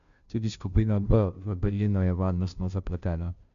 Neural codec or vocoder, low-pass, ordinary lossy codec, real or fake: codec, 16 kHz, 0.5 kbps, FunCodec, trained on Chinese and English, 25 frames a second; 7.2 kHz; MP3, 96 kbps; fake